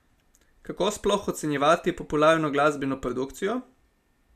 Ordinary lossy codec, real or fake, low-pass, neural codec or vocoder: none; real; 14.4 kHz; none